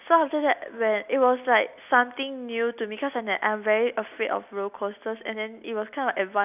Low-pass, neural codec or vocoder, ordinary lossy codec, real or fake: 3.6 kHz; none; none; real